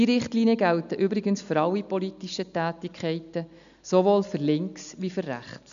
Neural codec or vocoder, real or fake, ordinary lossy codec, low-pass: none; real; none; 7.2 kHz